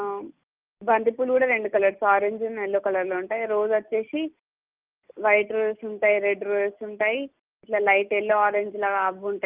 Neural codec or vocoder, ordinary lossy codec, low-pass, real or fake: none; Opus, 24 kbps; 3.6 kHz; real